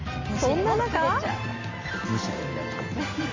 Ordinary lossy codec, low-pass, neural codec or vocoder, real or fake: Opus, 32 kbps; 7.2 kHz; none; real